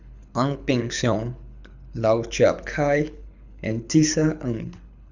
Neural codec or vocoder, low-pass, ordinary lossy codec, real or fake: codec, 24 kHz, 6 kbps, HILCodec; 7.2 kHz; none; fake